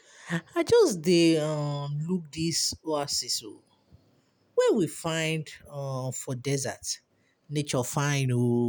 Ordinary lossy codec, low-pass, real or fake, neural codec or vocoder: none; none; real; none